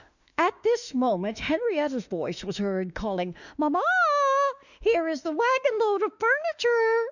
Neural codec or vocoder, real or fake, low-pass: autoencoder, 48 kHz, 32 numbers a frame, DAC-VAE, trained on Japanese speech; fake; 7.2 kHz